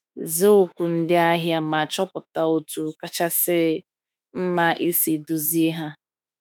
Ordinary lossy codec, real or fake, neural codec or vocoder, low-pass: none; fake; autoencoder, 48 kHz, 32 numbers a frame, DAC-VAE, trained on Japanese speech; none